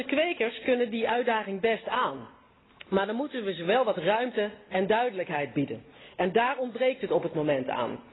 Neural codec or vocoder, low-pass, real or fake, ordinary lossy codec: none; 7.2 kHz; real; AAC, 16 kbps